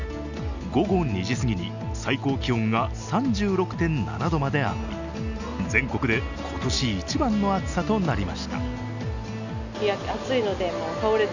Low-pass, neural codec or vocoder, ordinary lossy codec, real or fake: 7.2 kHz; none; none; real